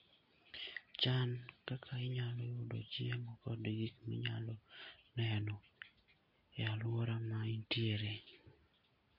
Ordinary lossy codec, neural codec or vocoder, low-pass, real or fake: MP3, 32 kbps; none; 5.4 kHz; real